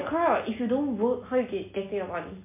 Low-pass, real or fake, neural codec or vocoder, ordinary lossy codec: 3.6 kHz; real; none; MP3, 24 kbps